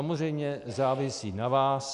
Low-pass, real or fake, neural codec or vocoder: 10.8 kHz; real; none